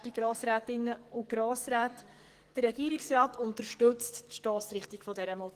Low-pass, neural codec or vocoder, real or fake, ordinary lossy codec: 14.4 kHz; codec, 44.1 kHz, 2.6 kbps, SNAC; fake; Opus, 24 kbps